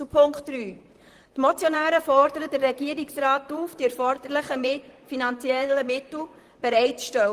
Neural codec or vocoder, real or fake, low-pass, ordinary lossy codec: vocoder, 44.1 kHz, 128 mel bands, Pupu-Vocoder; fake; 14.4 kHz; Opus, 24 kbps